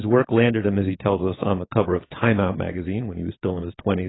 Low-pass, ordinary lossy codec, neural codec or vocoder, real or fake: 7.2 kHz; AAC, 16 kbps; vocoder, 22.05 kHz, 80 mel bands, WaveNeXt; fake